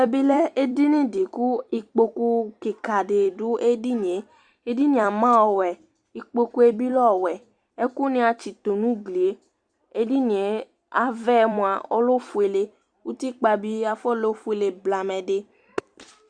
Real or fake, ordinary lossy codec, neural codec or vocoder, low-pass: fake; Opus, 64 kbps; vocoder, 44.1 kHz, 128 mel bands every 256 samples, BigVGAN v2; 9.9 kHz